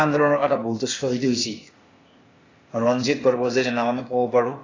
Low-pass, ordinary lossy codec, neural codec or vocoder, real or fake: 7.2 kHz; AAC, 32 kbps; codec, 16 kHz, 0.8 kbps, ZipCodec; fake